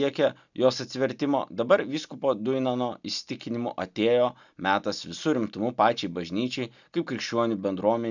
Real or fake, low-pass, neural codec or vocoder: real; 7.2 kHz; none